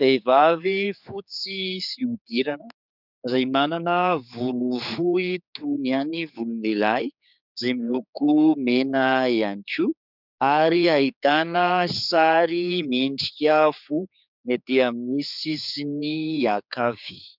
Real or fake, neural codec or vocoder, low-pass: fake; codec, 16 kHz, 4 kbps, X-Codec, HuBERT features, trained on general audio; 5.4 kHz